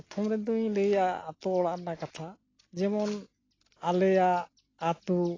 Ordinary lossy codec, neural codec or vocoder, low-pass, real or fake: AAC, 32 kbps; none; 7.2 kHz; real